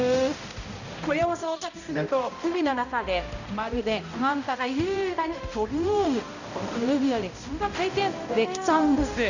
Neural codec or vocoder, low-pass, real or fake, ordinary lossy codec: codec, 16 kHz, 0.5 kbps, X-Codec, HuBERT features, trained on balanced general audio; 7.2 kHz; fake; none